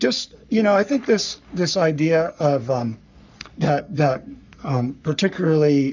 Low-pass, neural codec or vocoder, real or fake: 7.2 kHz; codec, 44.1 kHz, 3.4 kbps, Pupu-Codec; fake